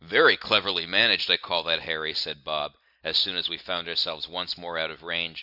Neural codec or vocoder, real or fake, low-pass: none; real; 5.4 kHz